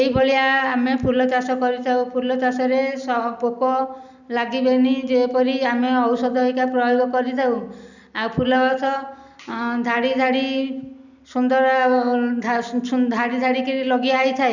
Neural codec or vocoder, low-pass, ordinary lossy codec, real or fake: none; 7.2 kHz; none; real